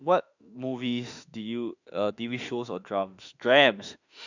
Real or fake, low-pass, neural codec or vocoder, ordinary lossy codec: fake; 7.2 kHz; autoencoder, 48 kHz, 32 numbers a frame, DAC-VAE, trained on Japanese speech; none